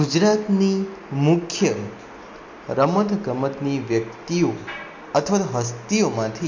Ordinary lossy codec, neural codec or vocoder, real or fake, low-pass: MP3, 48 kbps; none; real; 7.2 kHz